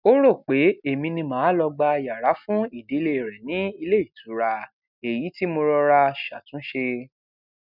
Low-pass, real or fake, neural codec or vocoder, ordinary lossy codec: 5.4 kHz; real; none; none